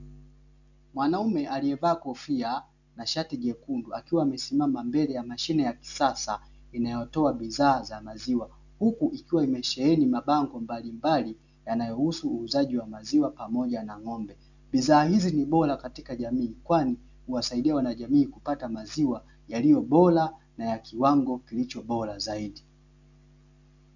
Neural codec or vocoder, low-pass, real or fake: none; 7.2 kHz; real